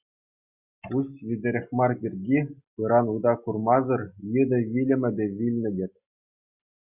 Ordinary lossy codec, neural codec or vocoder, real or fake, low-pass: Opus, 64 kbps; none; real; 3.6 kHz